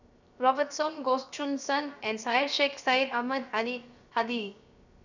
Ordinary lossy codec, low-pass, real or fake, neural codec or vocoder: none; 7.2 kHz; fake; codec, 16 kHz, 0.7 kbps, FocalCodec